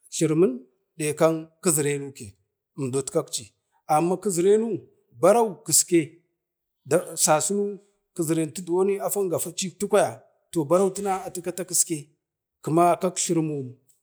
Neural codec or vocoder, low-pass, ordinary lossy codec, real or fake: none; none; none; real